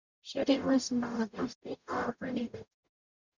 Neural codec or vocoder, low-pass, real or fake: codec, 44.1 kHz, 0.9 kbps, DAC; 7.2 kHz; fake